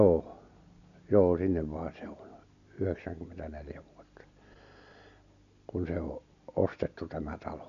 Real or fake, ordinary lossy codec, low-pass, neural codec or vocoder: real; none; 7.2 kHz; none